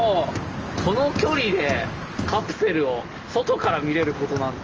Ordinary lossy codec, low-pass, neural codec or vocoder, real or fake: Opus, 24 kbps; 7.2 kHz; none; real